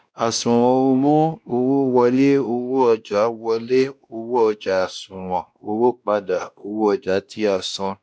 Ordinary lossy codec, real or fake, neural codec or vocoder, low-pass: none; fake; codec, 16 kHz, 1 kbps, X-Codec, WavLM features, trained on Multilingual LibriSpeech; none